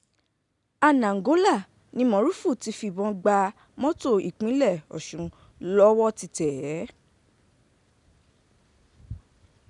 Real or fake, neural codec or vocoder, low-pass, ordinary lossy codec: real; none; 10.8 kHz; none